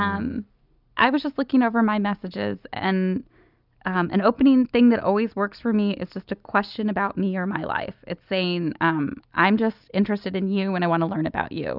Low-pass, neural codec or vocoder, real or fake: 5.4 kHz; none; real